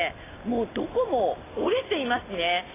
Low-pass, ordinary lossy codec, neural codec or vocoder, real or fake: 3.6 kHz; AAC, 16 kbps; none; real